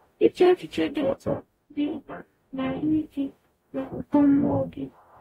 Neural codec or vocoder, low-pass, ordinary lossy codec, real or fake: codec, 44.1 kHz, 0.9 kbps, DAC; 19.8 kHz; AAC, 48 kbps; fake